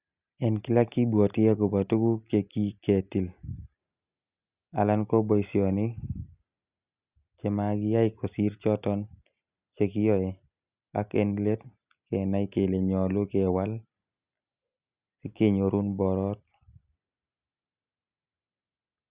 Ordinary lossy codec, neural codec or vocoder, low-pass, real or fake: Opus, 64 kbps; none; 3.6 kHz; real